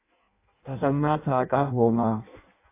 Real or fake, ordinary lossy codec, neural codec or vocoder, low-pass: fake; AAC, 24 kbps; codec, 16 kHz in and 24 kHz out, 0.6 kbps, FireRedTTS-2 codec; 3.6 kHz